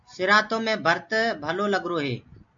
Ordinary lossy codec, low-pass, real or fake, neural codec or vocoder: AAC, 64 kbps; 7.2 kHz; real; none